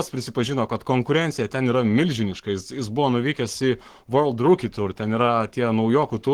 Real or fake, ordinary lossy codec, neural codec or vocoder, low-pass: fake; Opus, 16 kbps; codec, 44.1 kHz, 7.8 kbps, Pupu-Codec; 19.8 kHz